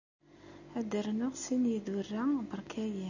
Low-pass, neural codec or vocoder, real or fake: 7.2 kHz; none; real